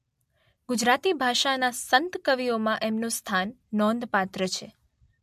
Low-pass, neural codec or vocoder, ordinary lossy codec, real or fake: 14.4 kHz; none; MP3, 64 kbps; real